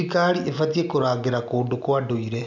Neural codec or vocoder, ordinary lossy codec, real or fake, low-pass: none; none; real; 7.2 kHz